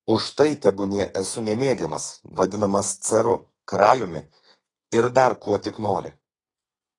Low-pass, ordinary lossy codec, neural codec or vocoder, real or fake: 10.8 kHz; AAC, 32 kbps; codec, 32 kHz, 1.9 kbps, SNAC; fake